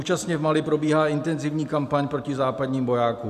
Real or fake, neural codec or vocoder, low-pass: real; none; 14.4 kHz